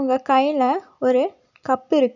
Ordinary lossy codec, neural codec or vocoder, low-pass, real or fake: none; none; 7.2 kHz; real